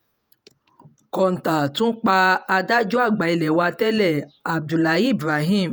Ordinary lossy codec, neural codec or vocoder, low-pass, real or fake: none; vocoder, 48 kHz, 128 mel bands, Vocos; none; fake